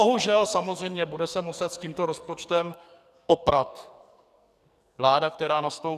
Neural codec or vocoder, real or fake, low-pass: codec, 44.1 kHz, 2.6 kbps, SNAC; fake; 14.4 kHz